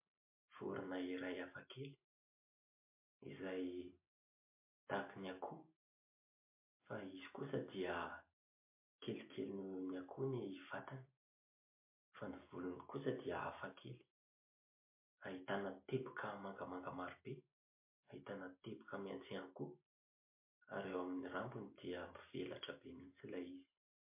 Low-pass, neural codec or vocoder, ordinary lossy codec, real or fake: 3.6 kHz; none; MP3, 24 kbps; real